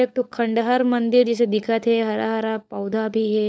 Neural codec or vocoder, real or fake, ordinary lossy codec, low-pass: codec, 16 kHz, 16 kbps, FunCodec, trained on LibriTTS, 50 frames a second; fake; none; none